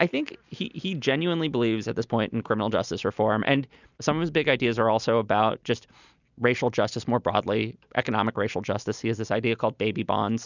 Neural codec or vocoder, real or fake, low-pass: none; real; 7.2 kHz